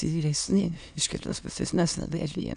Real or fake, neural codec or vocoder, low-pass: fake; autoencoder, 22.05 kHz, a latent of 192 numbers a frame, VITS, trained on many speakers; 9.9 kHz